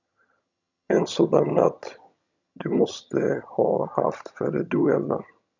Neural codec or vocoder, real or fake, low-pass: vocoder, 22.05 kHz, 80 mel bands, HiFi-GAN; fake; 7.2 kHz